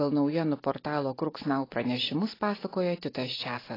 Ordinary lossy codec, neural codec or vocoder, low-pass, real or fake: AAC, 24 kbps; none; 5.4 kHz; real